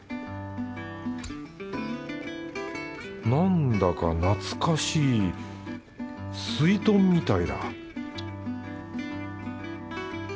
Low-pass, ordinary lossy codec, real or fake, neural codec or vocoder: none; none; real; none